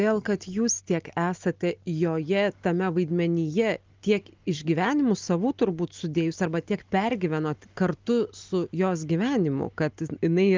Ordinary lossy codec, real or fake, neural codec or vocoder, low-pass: Opus, 32 kbps; real; none; 7.2 kHz